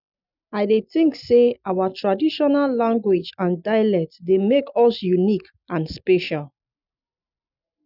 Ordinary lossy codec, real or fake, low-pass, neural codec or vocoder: none; real; 5.4 kHz; none